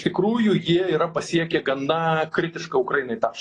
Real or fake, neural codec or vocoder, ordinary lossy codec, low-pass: real; none; AAC, 32 kbps; 10.8 kHz